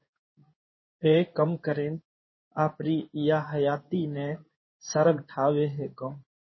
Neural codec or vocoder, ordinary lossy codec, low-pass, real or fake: codec, 16 kHz in and 24 kHz out, 1 kbps, XY-Tokenizer; MP3, 24 kbps; 7.2 kHz; fake